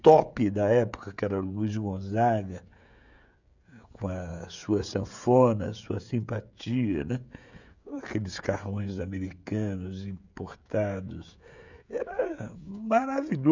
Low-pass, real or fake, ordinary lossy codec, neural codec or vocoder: 7.2 kHz; fake; none; codec, 16 kHz, 16 kbps, FreqCodec, smaller model